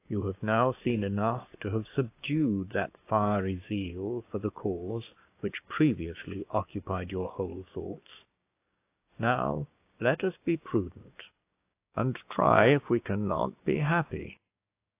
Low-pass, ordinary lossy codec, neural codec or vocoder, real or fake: 3.6 kHz; AAC, 24 kbps; codec, 16 kHz, 6 kbps, DAC; fake